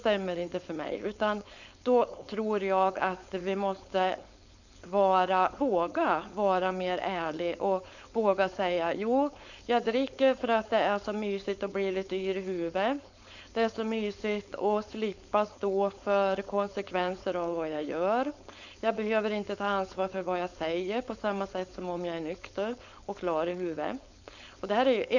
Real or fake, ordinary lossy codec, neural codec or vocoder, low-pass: fake; none; codec, 16 kHz, 4.8 kbps, FACodec; 7.2 kHz